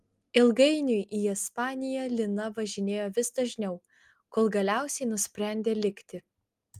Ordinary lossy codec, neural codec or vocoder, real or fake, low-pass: Opus, 32 kbps; none; real; 14.4 kHz